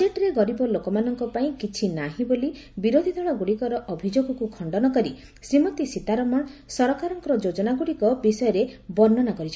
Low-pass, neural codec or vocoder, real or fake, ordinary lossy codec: none; none; real; none